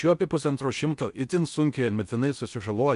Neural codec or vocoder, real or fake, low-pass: codec, 16 kHz in and 24 kHz out, 0.6 kbps, FocalCodec, streaming, 4096 codes; fake; 10.8 kHz